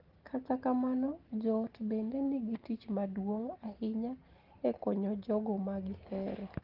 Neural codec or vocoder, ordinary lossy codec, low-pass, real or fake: none; Opus, 16 kbps; 5.4 kHz; real